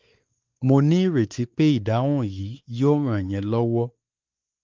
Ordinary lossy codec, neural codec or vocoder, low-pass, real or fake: Opus, 32 kbps; codec, 16 kHz, 4 kbps, X-Codec, WavLM features, trained on Multilingual LibriSpeech; 7.2 kHz; fake